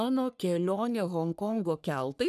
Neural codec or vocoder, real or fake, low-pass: codec, 44.1 kHz, 3.4 kbps, Pupu-Codec; fake; 14.4 kHz